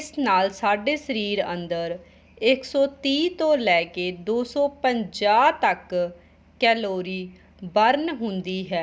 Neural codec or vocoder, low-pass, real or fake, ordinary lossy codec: none; none; real; none